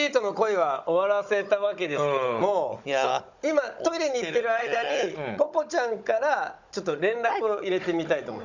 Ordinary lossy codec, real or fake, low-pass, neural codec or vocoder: none; fake; 7.2 kHz; codec, 16 kHz, 16 kbps, FunCodec, trained on Chinese and English, 50 frames a second